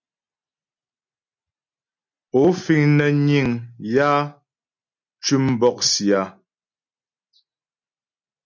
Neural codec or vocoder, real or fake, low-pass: none; real; 7.2 kHz